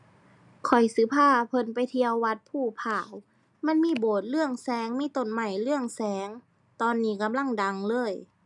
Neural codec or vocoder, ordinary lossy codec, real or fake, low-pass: none; none; real; 10.8 kHz